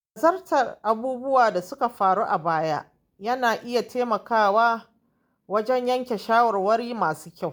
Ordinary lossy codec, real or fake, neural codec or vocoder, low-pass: none; real; none; none